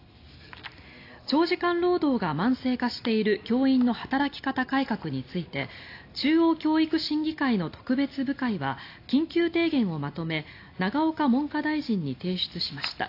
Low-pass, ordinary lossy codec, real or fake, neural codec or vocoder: 5.4 kHz; AAC, 32 kbps; real; none